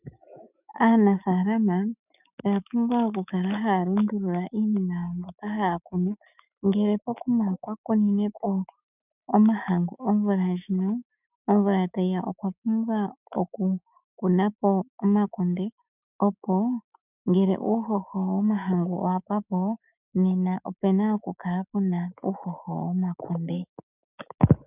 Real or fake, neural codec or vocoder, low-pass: fake; autoencoder, 48 kHz, 128 numbers a frame, DAC-VAE, trained on Japanese speech; 3.6 kHz